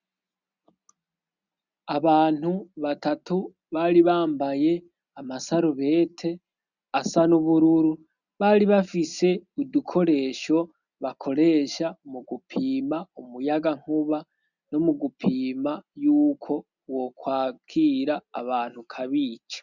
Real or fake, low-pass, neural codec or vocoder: real; 7.2 kHz; none